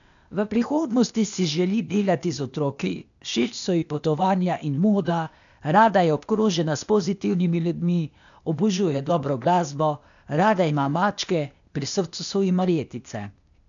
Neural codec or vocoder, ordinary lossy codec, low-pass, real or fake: codec, 16 kHz, 0.8 kbps, ZipCodec; none; 7.2 kHz; fake